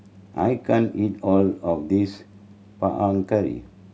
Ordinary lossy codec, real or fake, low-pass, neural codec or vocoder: none; real; none; none